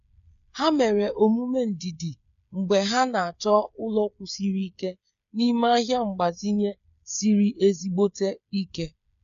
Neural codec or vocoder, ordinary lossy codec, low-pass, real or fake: codec, 16 kHz, 8 kbps, FreqCodec, smaller model; MP3, 64 kbps; 7.2 kHz; fake